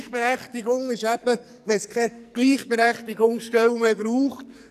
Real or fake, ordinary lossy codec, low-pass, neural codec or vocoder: fake; none; 14.4 kHz; codec, 44.1 kHz, 2.6 kbps, SNAC